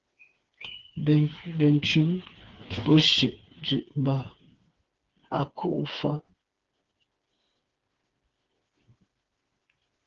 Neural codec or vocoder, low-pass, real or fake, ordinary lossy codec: codec, 16 kHz, 4 kbps, FreqCodec, smaller model; 7.2 kHz; fake; Opus, 16 kbps